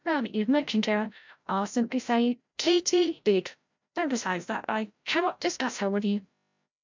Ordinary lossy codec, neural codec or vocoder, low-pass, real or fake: MP3, 64 kbps; codec, 16 kHz, 0.5 kbps, FreqCodec, larger model; 7.2 kHz; fake